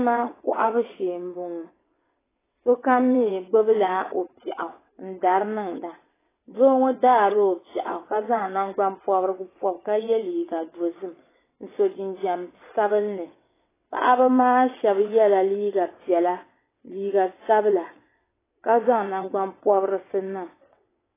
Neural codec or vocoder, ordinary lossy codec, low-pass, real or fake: vocoder, 22.05 kHz, 80 mel bands, WaveNeXt; AAC, 16 kbps; 3.6 kHz; fake